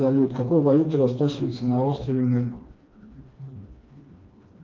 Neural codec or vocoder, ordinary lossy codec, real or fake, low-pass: codec, 16 kHz, 2 kbps, FreqCodec, smaller model; Opus, 24 kbps; fake; 7.2 kHz